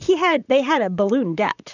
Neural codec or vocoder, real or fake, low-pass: vocoder, 44.1 kHz, 128 mel bands, Pupu-Vocoder; fake; 7.2 kHz